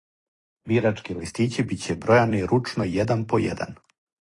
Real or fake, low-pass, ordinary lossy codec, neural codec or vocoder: fake; 10.8 kHz; AAC, 32 kbps; vocoder, 44.1 kHz, 128 mel bands every 256 samples, BigVGAN v2